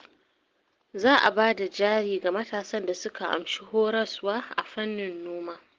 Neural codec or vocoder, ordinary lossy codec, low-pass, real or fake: none; Opus, 16 kbps; 7.2 kHz; real